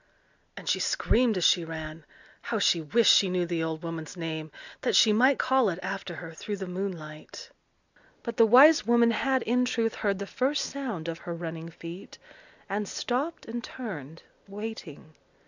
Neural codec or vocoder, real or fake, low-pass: none; real; 7.2 kHz